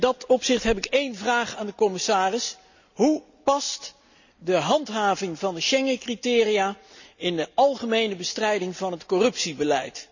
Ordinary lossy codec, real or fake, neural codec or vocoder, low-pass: none; real; none; 7.2 kHz